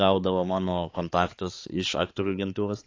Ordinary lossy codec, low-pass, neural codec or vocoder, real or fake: AAC, 32 kbps; 7.2 kHz; codec, 16 kHz, 4 kbps, X-Codec, HuBERT features, trained on balanced general audio; fake